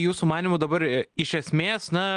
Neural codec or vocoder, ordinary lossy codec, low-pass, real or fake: none; Opus, 24 kbps; 9.9 kHz; real